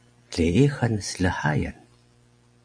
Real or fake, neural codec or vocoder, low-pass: real; none; 9.9 kHz